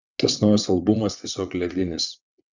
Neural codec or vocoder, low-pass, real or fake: vocoder, 22.05 kHz, 80 mel bands, WaveNeXt; 7.2 kHz; fake